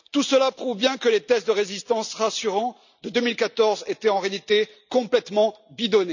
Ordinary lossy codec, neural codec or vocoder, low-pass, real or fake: none; none; 7.2 kHz; real